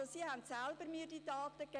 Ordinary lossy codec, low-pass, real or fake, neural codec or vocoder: none; 9.9 kHz; real; none